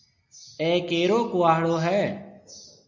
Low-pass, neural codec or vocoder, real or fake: 7.2 kHz; none; real